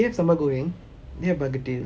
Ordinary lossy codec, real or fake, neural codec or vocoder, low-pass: none; real; none; none